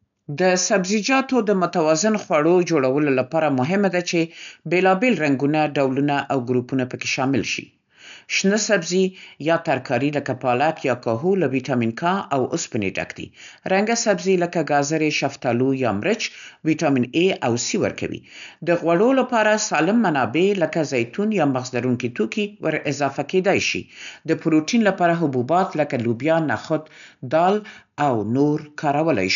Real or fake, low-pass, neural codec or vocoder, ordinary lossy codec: real; 7.2 kHz; none; none